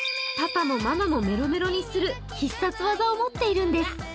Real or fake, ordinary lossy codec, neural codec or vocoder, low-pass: real; none; none; none